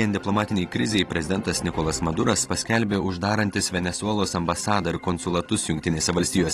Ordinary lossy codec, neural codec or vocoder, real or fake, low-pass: AAC, 32 kbps; none; real; 19.8 kHz